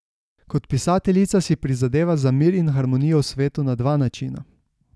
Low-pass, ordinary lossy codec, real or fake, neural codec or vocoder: none; none; real; none